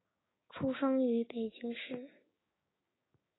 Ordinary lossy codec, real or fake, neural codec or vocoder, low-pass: AAC, 16 kbps; fake; codec, 16 kHz, 6 kbps, DAC; 7.2 kHz